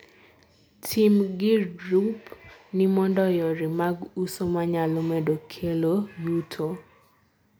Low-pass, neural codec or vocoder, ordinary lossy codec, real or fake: none; none; none; real